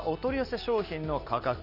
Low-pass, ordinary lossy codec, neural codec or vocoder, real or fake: 5.4 kHz; none; none; real